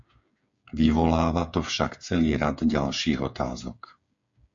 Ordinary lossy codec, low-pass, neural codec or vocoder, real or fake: MP3, 48 kbps; 7.2 kHz; codec, 16 kHz, 8 kbps, FreqCodec, smaller model; fake